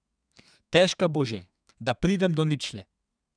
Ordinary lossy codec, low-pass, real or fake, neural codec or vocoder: none; 9.9 kHz; fake; codec, 32 kHz, 1.9 kbps, SNAC